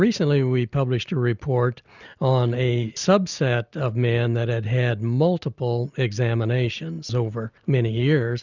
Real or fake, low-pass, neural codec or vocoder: real; 7.2 kHz; none